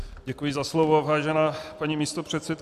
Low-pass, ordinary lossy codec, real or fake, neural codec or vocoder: 14.4 kHz; MP3, 96 kbps; real; none